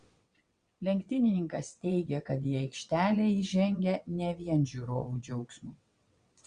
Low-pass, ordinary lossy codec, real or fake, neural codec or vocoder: 9.9 kHz; Opus, 64 kbps; fake; vocoder, 22.05 kHz, 80 mel bands, WaveNeXt